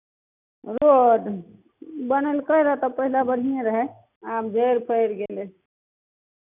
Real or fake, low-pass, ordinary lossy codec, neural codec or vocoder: real; 3.6 kHz; none; none